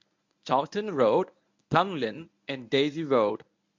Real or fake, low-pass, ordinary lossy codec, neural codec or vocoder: fake; 7.2 kHz; MP3, 64 kbps; codec, 24 kHz, 0.9 kbps, WavTokenizer, medium speech release version 1